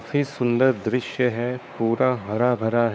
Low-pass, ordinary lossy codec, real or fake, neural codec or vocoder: none; none; fake; codec, 16 kHz, 4 kbps, X-Codec, WavLM features, trained on Multilingual LibriSpeech